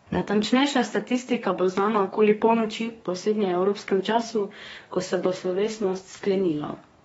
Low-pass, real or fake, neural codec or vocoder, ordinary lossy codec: 14.4 kHz; fake; codec, 32 kHz, 1.9 kbps, SNAC; AAC, 24 kbps